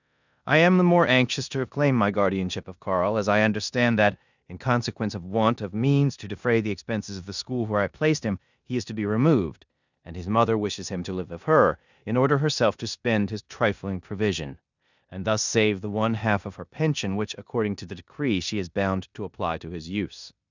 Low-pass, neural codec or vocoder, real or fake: 7.2 kHz; codec, 16 kHz in and 24 kHz out, 0.9 kbps, LongCat-Audio-Codec, four codebook decoder; fake